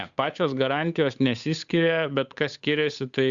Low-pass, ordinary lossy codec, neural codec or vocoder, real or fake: 7.2 kHz; Opus, 64 kbps; codec, 16 kHz, 8 kbps, FunCodec, trained on Chinese and English, 25 frames a second; fake